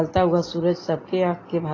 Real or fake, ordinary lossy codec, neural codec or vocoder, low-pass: real; AAC, 32 kbps; none; 7.2 kHz